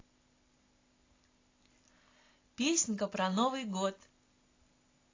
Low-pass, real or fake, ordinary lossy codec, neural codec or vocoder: 7.2 kHz; real; AAC, 32 kbps; none